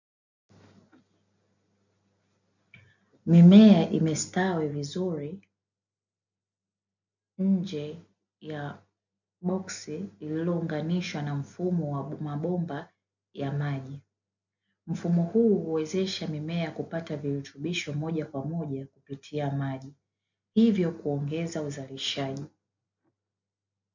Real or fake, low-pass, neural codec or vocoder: real; 7.2 kHz; none